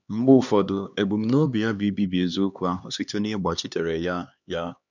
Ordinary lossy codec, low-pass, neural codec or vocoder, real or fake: none; 7.2 kHz; codec, 16 kHz, 2 kbps, X-Codec, HuBERT features, trained on LibriSpeech; fake